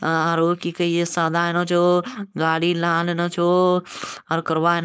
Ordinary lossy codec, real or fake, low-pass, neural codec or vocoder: none; fake; none; codec, 16 kHz, 4.8 kbps, FACodec